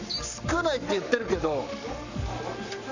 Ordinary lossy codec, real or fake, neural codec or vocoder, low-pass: none; fake; codec, 44.1 kHz, 7.8 kbps, Pupu-Codec; 7.2 kHz